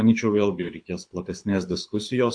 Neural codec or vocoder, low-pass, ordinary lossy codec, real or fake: codec, 24 kHz, 6 kbps, HILCodec; 9.9 kHz; AAC, 64 kbps; fake